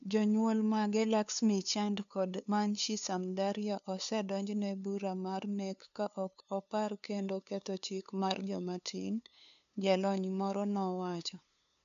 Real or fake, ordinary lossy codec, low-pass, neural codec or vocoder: fake; none; 7.2 kHz; codec, 16 kHz, 2 kbps, FunCodec, trained on LibriTTS, 25 frames a second